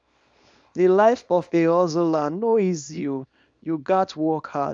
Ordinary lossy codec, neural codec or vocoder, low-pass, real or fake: none; codec, 24 kHz, 0.9 kbps, WavTokenizer, small release; 9.9 kHz; fake